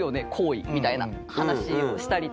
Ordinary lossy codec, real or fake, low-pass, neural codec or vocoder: none; real; none; none